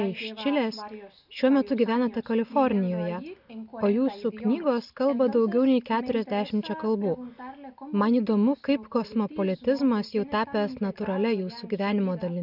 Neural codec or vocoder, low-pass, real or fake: none; 5.4 kHz; real